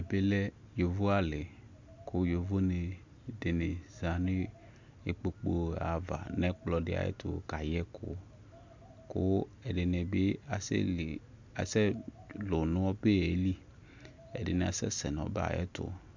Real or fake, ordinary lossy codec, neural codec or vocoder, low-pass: real; MP3, 64 kbps; none; 7.2 kHz